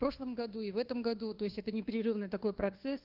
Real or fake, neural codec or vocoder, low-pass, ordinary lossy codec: fake; codec, 24 kHz, 1.2 kbps, DualCodec; 5.4 kHz; Opus, 16 kbps